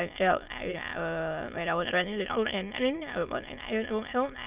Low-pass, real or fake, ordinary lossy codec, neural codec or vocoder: 3.6 kHz; fake; Opus, 24 kbps; autoencoder, 22.05 kHz, a latent of 192 numbers a frame, VITS, trained on many speakers